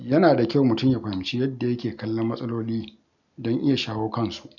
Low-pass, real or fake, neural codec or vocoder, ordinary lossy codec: 7.2 kHz; real; none; none